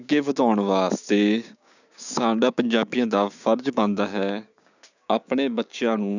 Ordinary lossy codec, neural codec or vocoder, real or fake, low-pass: none; codec, 16 kHz, 6 kbps, DAC; fake; 7.2 kHz